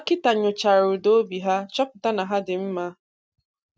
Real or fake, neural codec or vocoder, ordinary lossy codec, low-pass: real; none; none; none